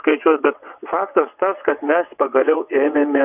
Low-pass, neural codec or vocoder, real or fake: 3.6 kHz; vocoder, 22.05 kHz, 80 mel bands, WaveNeXt; fake